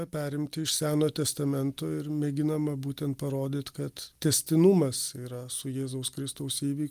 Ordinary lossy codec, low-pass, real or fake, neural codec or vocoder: Opus, 32 kbps; 14.4 kHz; real; none